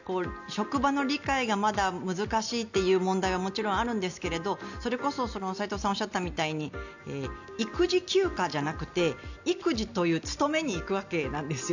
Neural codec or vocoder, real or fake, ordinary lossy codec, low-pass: none; real; none; 7.2 kHz